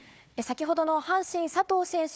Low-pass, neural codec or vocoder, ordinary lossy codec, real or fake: none; codec, 16 kHz, 4 kbps, FunCodec, trained on Chinese and English, 50 frames a second; none; fake